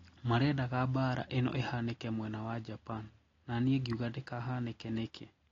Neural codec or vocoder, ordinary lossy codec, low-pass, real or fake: none; AAC, 32 kbps; 7.2 kHz; real